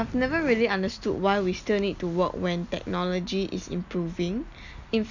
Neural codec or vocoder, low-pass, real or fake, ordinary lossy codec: none; 7.2 kHz; real; none